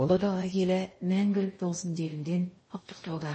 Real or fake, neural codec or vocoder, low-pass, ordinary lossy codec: fake; codec, 16 kHz in and 24 kHz out, 0.6 kbps, FocalCodec, streaming, 2048 codes; 9.9 kHz; MP3, 32 kbps